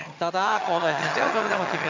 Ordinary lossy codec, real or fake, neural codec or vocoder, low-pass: MP3, 64 kbps; fake; vocoder, 22.05 kHz, 80 mel bands, HiFi-GAN; 7.2 kHz